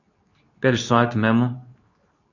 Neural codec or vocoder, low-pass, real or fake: codec, 24 kHz, 0.9 kbps, WavTokenizer, medium speech release version 2; 7.2 kHz; fake